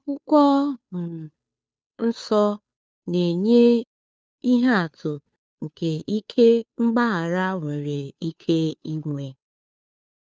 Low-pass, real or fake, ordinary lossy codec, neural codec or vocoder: none; fake; none; codec, 16 kHz, 2 kbps, FunCodec, trained on Chinese and English, 25 frames a second